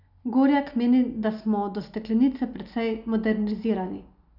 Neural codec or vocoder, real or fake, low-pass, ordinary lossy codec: none; real; 5.4 kHz; none